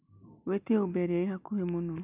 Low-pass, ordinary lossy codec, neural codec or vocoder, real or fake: 3.6 kHz; none; none; real